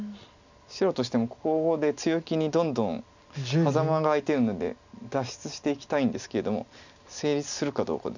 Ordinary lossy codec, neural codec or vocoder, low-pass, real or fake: none; none; 7.2 kHz; real